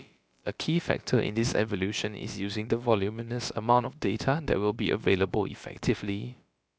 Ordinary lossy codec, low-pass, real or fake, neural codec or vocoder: none; none; fake; codec, 16 kHz, about 1 kbps, DyCAST, with the encoder's durations